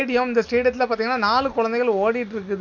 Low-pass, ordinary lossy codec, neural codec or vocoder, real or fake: 7.2 kHz; none; none; real